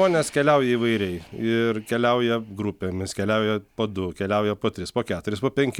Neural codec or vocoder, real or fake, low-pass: none; real; 19.8 kHz